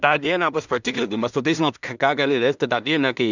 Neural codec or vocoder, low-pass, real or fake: codec, 16 kHz in and 24 kHz out, 0.4 kbps, LongCat-Audio-Codec, two codebook decoder; 7.2 kHz; fake